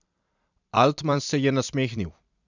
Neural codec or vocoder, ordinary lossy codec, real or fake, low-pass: vocoder, 44.1 kHz, 128 mel bands every 256 samples, BigVGAN v2; none; fake; 7.2 kHz